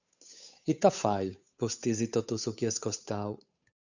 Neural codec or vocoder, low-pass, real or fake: codec, 16 kHz, 8 kbps, FunCodec, trained on Chinese and English, 25 frames a second; 7.2 kHz; fake